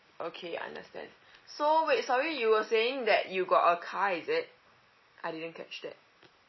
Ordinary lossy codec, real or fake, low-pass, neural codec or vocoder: MP3, 24 kbps; real; 7.2 kHz; none